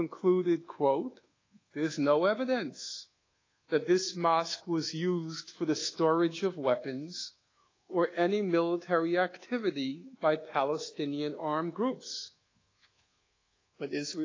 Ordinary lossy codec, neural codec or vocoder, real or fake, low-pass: AAC, 32 kbps; codec, 24 kHz, 1.2 kbps, DualCodec; fake; 7.2 kHz